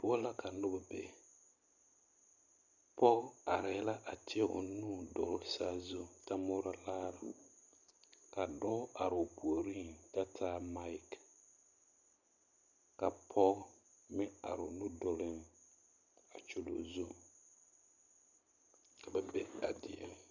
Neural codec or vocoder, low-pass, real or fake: none; 7.2 kHz; real